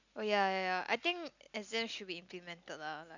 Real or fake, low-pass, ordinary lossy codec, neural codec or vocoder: real; 7.2 kHz; none; none